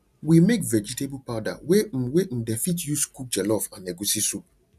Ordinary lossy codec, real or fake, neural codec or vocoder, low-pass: none; real; none; 14.4 kHz